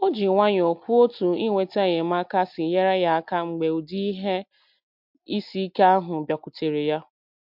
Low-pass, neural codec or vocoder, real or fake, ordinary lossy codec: 5.4 kHz; none; real; MP3, 48 kbps